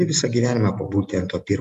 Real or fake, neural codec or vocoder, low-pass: real; none; 14.4 kHz